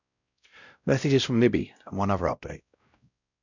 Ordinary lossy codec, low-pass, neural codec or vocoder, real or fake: none; 7.2 kHz; codec, 16 kHz, 0.5 kbps, X-Codec, WavLM features, trained on Multilingual LibriSpeech; fake